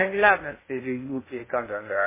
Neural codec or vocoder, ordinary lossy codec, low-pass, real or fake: codec, 16 kHz in and 24 kHz out, 0.6 kbps, FocalCodec, streaming, 2048 codes; MP3, 16 kbps; 3.6 kHz; fake